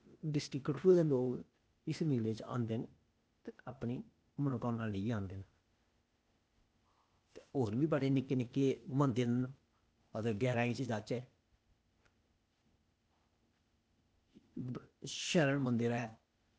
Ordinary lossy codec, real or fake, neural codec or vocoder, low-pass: none; fake; codec, 16 kHz, 0.8 kbps, ZipCodec; none